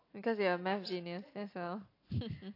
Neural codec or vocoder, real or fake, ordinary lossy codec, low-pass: none; real; AAC, 32 kbps; 5.4 kHz